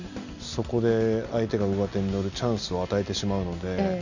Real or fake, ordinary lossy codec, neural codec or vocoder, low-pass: real; none; none; 7.2 kHz